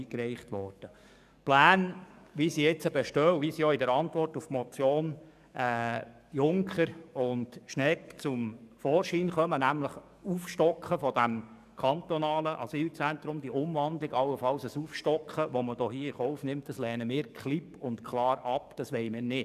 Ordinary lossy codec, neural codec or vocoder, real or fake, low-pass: none; codec, 44.1 kHz, 7.8 kbps, DAC; fake; 14.4 kHz